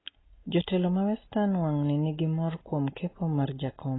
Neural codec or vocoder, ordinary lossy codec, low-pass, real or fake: none; AAC, 16 kbps; 7.2 kHz; real